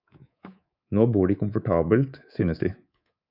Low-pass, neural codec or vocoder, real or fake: 5.4 kHz; codec, 24 kHz, 3.1 kbps, DualCodec; fake